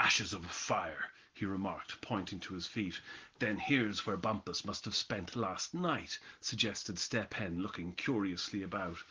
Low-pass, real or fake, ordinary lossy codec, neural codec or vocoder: 7.2 kHz; real; Opus, 16 kbps; none